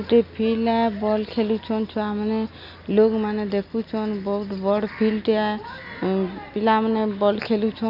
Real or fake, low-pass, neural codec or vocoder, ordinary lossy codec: real; 5.4 kHz; none; none